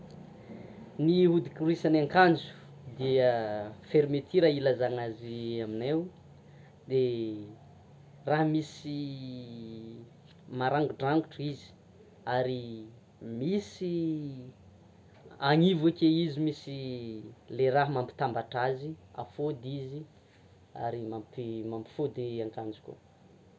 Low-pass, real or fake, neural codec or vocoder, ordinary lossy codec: none; real; none; none